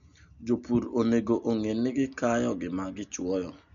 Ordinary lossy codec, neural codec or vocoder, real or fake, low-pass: none; none; real; 7.2 kHz